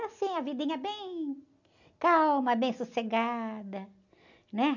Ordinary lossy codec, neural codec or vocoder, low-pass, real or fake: none; none; 7.2 kHz; real